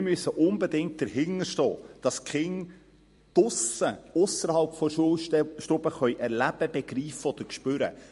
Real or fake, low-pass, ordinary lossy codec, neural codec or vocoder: fake; 14.4 kHz; MP3, 48 kbps; vocoder, 44.1 kHz, 128 mel bands every 256 samples, BigVGAN v2